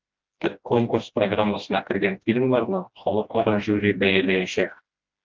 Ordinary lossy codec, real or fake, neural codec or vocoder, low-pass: Opus, 24 kbps; fake; codec, 16 kHz, 1 kbps, FreqCodec, smaller model; 7.2 kHz